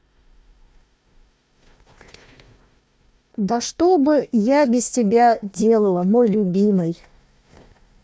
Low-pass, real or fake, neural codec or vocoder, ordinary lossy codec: none; fake; codec, 16 kHz, 1 kbps, FunCodec, trained on Chinese and English, 50 frames a second; none